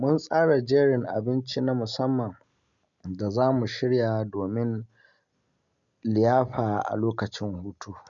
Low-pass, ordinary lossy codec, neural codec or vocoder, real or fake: 7.2 kHz; none; none; real